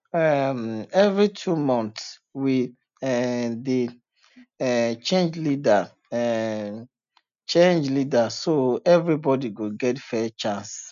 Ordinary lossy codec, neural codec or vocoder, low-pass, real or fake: none; none; 7.2 kHz; real